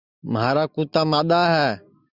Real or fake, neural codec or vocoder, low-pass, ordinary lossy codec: real; none; 5.4 kHz; Opus, 64 kbps